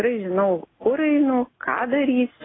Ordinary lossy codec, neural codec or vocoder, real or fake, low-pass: AAC, 16 kbps; none; real; 7.2 kHz